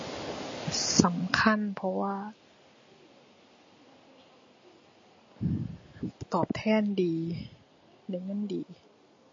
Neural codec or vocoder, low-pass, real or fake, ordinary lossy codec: none; 7.2 kHz; real; MP3, 32 kbps